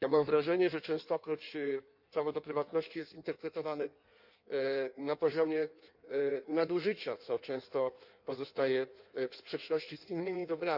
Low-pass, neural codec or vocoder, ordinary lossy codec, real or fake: 5.4 kHz; codec, 16 kHz in and 24 kHz out, 1.1 kbps, FireRedTTS-2 codec; none; fake